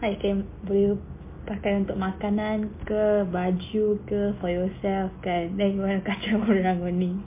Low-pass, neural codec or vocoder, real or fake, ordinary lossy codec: 3.6 kHz; none; real; MP3, 24 kbps